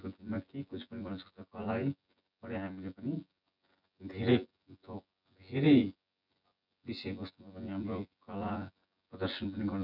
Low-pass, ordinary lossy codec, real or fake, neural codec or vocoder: 5.4 kHz; none; fake; vocoder, 24 kHz, 100 mel bands, Vocos